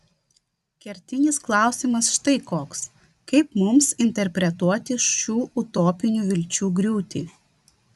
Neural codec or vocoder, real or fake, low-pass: vocoder, 44.1 kHz, 128 mel bands every 256 samples, BigVGAN v2; fake; 14.4 kHz